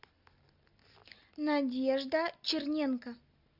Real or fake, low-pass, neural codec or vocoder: real; 5.4 kHz; none